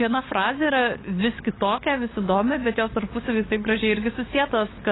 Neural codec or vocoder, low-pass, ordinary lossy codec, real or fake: codec, 16 kHz, 8 kbps, FunCodec, trained on LibriTTS, 25 frames a second; 7.2 kHz; AAC, 16 kbps; fake